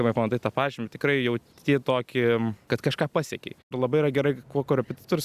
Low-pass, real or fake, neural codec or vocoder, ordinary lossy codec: 14.4 kHz; real; none; Opus, 64 kbps